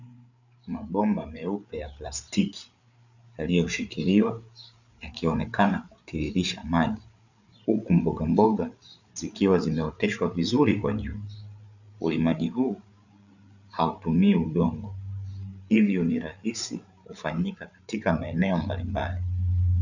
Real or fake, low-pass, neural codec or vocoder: fake; 7.2 kHz; codec, 16 kHz, 8 kbps, FreqCodec, larger model